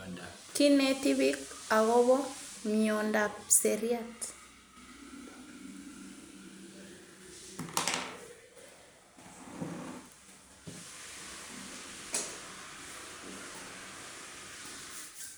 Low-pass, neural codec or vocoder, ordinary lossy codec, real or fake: none; none; none; real